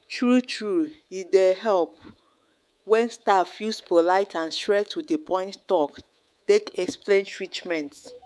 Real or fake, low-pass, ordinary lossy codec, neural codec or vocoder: fake; none; none; codec, 24 kHz, 3.1 kbps, DualCodec